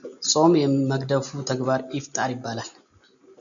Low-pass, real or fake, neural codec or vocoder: 7.2 kHz; real; none